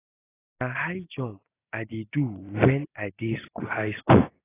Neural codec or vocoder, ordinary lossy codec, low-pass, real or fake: none; AAC, 16 kbps; 3.6 kHz; real